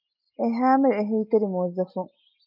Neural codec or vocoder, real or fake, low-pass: none; real; 5.4 kHz